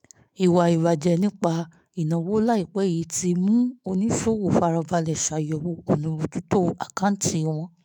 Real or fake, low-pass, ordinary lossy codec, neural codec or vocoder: fake; none; none; autoencoder, 48 kHz, 128 numbers a frame, DAC-VAE, trained on Japanese speech